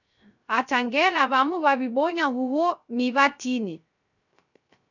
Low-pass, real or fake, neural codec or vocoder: 7.2 kHz; fake; codec, 16 kHz, 0.3 kbps, FocalCodec